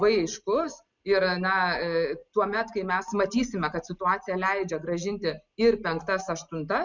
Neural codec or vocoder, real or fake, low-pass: none; real; 7.2 kHz